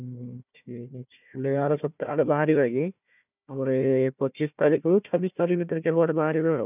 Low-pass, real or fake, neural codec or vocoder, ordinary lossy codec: 3.6 kHz; fake; codec, 16 kHz, 1 kbps, FunCodec, trained on Chinese and English, 50 frames a second; none